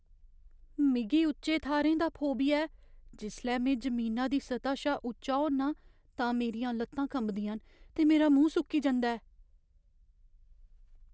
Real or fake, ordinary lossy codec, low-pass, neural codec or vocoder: real; none; none; none